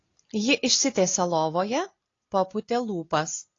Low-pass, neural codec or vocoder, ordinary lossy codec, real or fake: 7.2 kHz; none; AAC, 32 kbps; real